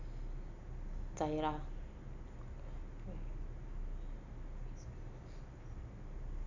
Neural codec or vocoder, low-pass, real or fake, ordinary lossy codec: none; 7.2 kHz; real; none